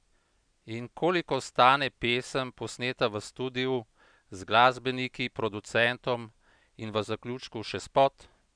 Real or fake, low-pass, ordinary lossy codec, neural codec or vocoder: real; 9.9 kHz; none; none